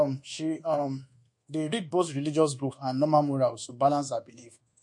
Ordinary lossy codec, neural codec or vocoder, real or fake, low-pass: MP3, 48 kbps; codec, 24 kHz, 1.2 kbps, DualCodec; fake; 10.8 kHz